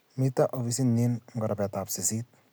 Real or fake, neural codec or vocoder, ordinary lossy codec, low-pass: real; none; none; none